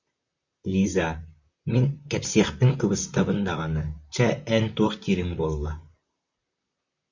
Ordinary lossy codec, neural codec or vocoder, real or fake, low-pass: Opus, 64 kbps; vocoder, 44.1 kHz, 128 mel bands, Pupu-Vocoder; fake; 7.2 kHz